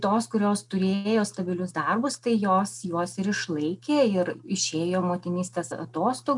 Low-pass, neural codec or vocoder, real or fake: 10.8 kHz; none; real